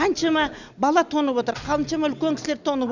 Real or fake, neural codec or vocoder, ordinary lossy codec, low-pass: real; none; none; 7.2 kHz